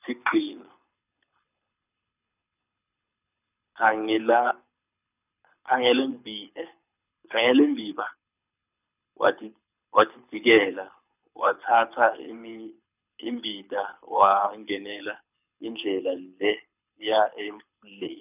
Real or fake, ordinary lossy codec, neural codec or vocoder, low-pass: fake; none; codec, 24 kHz, 6 kbps, HILCodec; 3.6 kHz